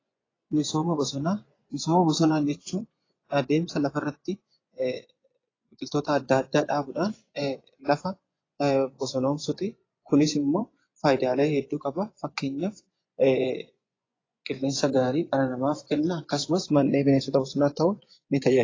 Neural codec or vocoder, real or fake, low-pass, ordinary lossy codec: vocoder, 24 kHz, 100 mel bands, Vocos; fake; 7.2 kHz; AAC, 32 kbps